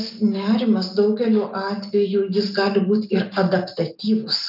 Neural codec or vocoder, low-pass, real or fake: none; 5.4 kHz; real